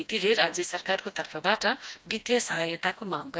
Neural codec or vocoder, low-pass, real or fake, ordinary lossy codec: codec, 16 kHz, 1 kbps, FreqCodec, smaller model; none; fake; none